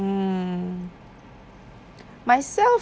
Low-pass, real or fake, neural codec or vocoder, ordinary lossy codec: none; real; none; none